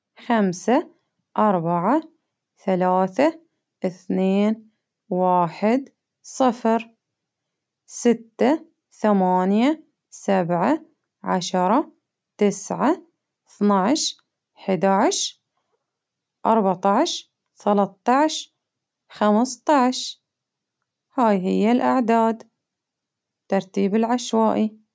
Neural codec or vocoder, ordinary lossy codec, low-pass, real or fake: none; none; none; real